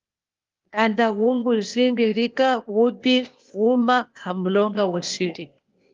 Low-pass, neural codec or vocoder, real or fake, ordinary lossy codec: 7.2 kHz; codec, 16 kHz, 0.8 kbps, ZipCodec; fake; Opus, 32 kbps